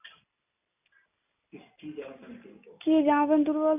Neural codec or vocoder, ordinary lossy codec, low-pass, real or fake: none; none; 3.6 kHz; real